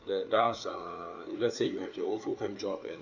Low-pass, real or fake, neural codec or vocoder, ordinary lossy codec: 7.2 kHz; fake; codec, 16 kHz, 4 kbps, FreqCodec, larger model; none